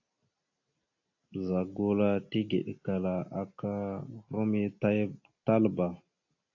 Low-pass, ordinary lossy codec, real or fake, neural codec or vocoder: 7.2 kHz; AAC, 48 kbps; real; none